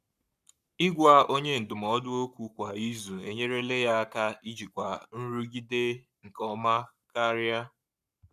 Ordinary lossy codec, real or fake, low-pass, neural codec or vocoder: none; fake; 14.4 kHz; codec, 44.1 kHz, 7.8 kbps, Pupu-Codec